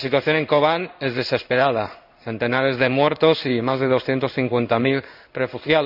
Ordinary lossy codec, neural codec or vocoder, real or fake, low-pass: none; codec, 16 kHz in and 24 kHz out, 1 kbps, XY-Tokenizer; fake; 5.4 kHz